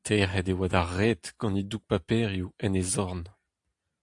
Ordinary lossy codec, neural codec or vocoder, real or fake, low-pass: AAC, 64 kbps; none; real; 10.8 kHz